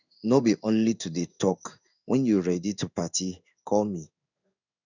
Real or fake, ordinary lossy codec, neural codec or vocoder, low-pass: fake; none; codec, 16 kHz in and 24 kHz out, 1 kbps, XY-Tokenizer; 7.2 kHz